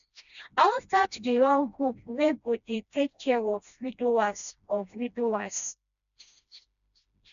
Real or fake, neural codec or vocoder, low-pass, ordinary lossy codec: fake; codec, 16 kHz, 1 kbps, FreqCodec, smaller model; 7.2 kHz; none